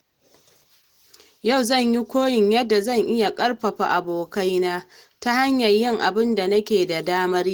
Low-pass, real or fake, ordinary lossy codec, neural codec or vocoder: 19.8 kHz; real; Opus, 16 kbps; none